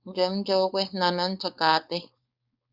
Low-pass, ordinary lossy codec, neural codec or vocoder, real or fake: 5.4 kHz; Opus, 64 kbps; codec, 16 kHz, 4.8 kbps, FACodec; fake